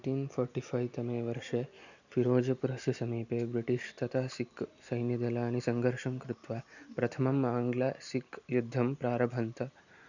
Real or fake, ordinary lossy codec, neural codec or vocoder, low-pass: real; none; none; 7.2 kHz